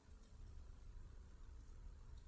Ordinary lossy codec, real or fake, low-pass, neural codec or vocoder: none; fake; none; codec, 16 kHz, 16 kbps, FreqCodec, larger model